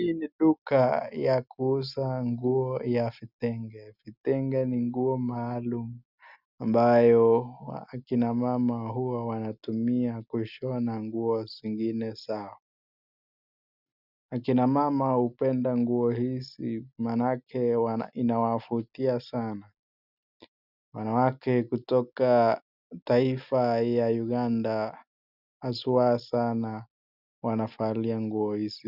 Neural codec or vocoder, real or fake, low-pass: none; real; 5.4 kHz